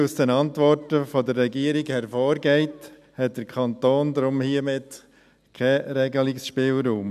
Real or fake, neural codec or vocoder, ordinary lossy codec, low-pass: real; none; none; 14.4 kHz